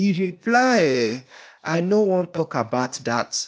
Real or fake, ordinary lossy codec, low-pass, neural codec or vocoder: fake; none; none; codec, 16 kHz, 0.8 kbps, ZipCodec